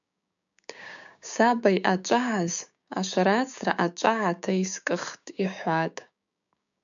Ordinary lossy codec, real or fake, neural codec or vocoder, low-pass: MP3, 96 kbps; fake; codec, 16 kHz, 6 kbps, DAC; 7.2 kHz